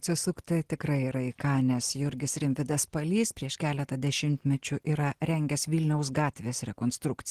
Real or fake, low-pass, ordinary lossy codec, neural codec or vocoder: real; 14.4 kHz; Opus, 16 kbps; none